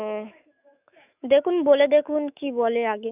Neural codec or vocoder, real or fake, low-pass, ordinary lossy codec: autoencoder, 48 kHz, 128 numbers a frame, DAC-VAE, trained on Japanese speech; fake; 3.6 kHz; none